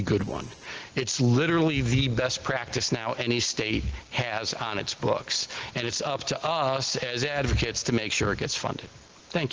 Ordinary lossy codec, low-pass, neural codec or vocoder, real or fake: Opus, 16 kbps; 7.2 kHz; none; real